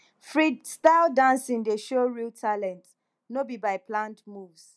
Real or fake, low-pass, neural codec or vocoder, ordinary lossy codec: real; none; none; none